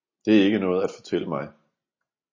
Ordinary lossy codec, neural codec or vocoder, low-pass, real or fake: MP3, 32 kbps; none; 7.2 kHz; real